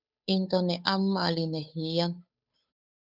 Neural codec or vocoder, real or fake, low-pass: codec, 16 kHz, 8 kbps, FunCodec, trained on Chinese and English, 25 frames a second; fake; 5.4 kHz